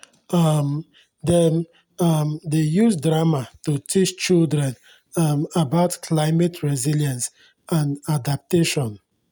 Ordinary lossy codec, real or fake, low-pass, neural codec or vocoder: none; real; none; none